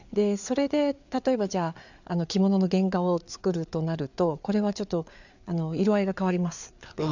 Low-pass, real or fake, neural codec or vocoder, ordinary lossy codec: 7.2 kHz; fake; codec, 16 kHz, 4 kbps, FreqCodec, larger model; none